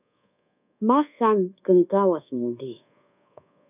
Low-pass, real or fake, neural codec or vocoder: 3.6 kHz; fake; codec, 24 kHz, 1.2 kbps, DualCodec